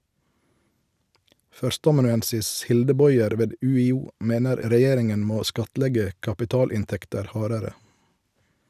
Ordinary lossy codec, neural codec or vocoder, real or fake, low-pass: none; none; real; 14.4 kHz